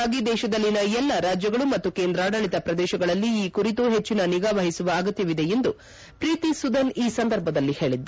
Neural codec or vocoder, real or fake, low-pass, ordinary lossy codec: none; real; none; none